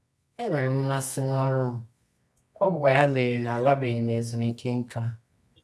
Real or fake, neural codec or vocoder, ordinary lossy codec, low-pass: fake; codec, 24 kHz, 0.9 kbps, WavTokenizer, medium music audio release; none; none